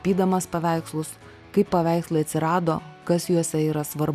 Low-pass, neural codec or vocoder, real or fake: 14.4 kHz; none; real